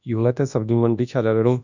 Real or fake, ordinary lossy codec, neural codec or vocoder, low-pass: fake; none; codec, 24 kHz, 0.9 kbps, WavTokenizer, large speech release; 7.2 kHz